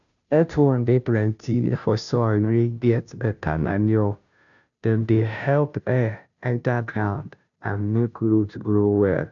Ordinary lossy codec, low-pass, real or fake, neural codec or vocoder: none; 7.2 kHz; fake; codec, 16 kHz, 0.5 kbps, FunCodec, trained on Chinese and English, 25 frames a second